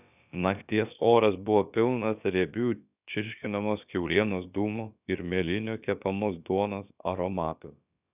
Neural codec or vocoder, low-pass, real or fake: codec, 16 kHz, about 1 kbps, DyCAST, with the encoder's durations; 3.6 kHz; fake